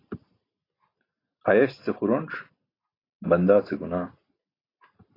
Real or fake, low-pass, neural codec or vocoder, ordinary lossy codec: real; 5.4 kHz; none; AAC, 24 kbps